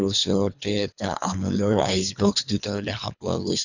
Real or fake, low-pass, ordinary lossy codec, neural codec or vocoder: fake; 7.2 kHz; none; codec, 24 kHz, 3 kbps, HILCodec